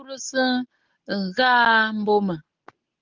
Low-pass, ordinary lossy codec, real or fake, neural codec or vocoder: 7.2 kHz; Opus, 16 kbps; real; none